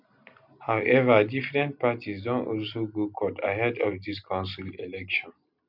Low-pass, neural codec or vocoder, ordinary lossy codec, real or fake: 5.4 kHz; none; none; real